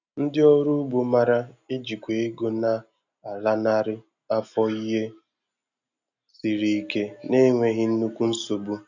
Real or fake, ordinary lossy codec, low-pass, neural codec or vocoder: real; none; 7.2 kHz; none